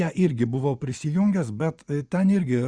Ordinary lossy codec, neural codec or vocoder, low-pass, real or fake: Opus, 64 kbps; vocoder, 24 kHz, 100 mel bands, Vocos; 9.9 kHz; fake